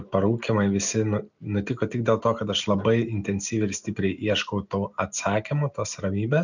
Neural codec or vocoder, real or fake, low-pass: none; real; 7.2 kHz